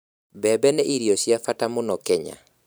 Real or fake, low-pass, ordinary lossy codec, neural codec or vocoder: fake; none; none; vocoder, 44.1 kHz, 128 mel bands every 512 samples, BigVGAN v2